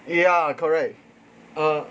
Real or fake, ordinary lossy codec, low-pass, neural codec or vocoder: real; none; none; none